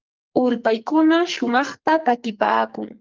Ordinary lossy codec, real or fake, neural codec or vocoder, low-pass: Opus, 32 kbps; fake; codec, 44.1 kHz, 2.6 kbps, SNAC; 7.2 kHz